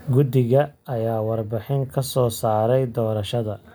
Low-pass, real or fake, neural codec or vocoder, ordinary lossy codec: none; real; none; none